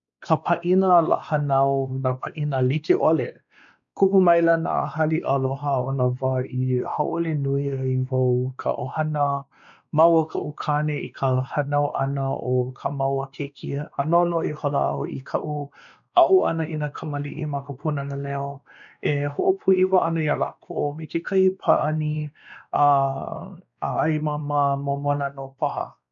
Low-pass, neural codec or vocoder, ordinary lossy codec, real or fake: 7.2 kHz; codec, 16 kHz, 2 kbps, X-Codec, WavLM features, trained on Multilingual LibriSpeech; none; fake